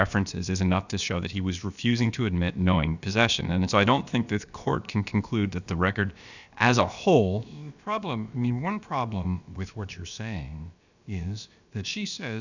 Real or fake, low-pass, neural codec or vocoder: fake; 7.2 kHz; codec, 16 kHz, about 1 kbps, DyCAST, with the encoder's durations